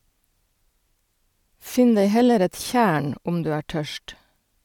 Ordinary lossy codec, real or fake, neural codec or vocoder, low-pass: MP3, 96 kbps; real; none; 19.8 kHz